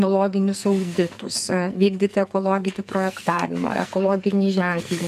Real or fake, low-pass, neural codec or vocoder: fake; 14.4 kHz; codec, 44.1 kHz, 2.6 kbps, SNAC